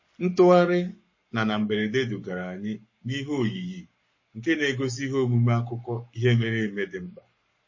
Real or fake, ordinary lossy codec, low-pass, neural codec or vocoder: fake; MP3, 32 kbps; 7.2 kHz; codec, 44.1 kHz, 7.8 kbps, Pupu-Codec